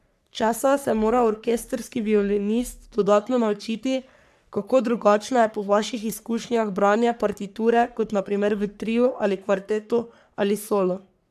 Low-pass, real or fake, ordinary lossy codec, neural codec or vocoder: 14.4 kHz; fake; none; codec, 44.1 kHz, 3.4 kbps, Pupu-Codec